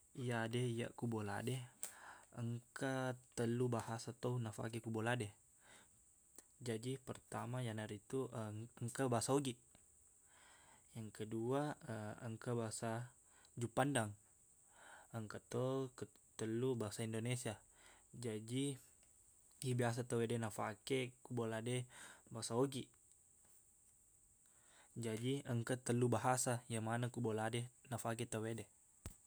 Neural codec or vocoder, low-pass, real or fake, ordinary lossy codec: none; none; real; none